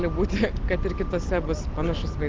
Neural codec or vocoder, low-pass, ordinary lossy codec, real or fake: none; 7.2 kHz; Opus, 16 kbps; real